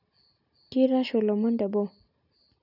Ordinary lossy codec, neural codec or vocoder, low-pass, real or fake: none; none; 5.4 kHz; real